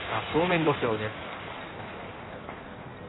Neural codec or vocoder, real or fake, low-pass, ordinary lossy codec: codec, 16 kHz in and 24 kHz out, 1.1 kbps, FireRedTTS-2 codec; fake; 7.2 kHz; AAC, 16 kbps